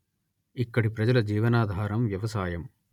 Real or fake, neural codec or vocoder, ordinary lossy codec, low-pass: real; none; none; 19.8 kHz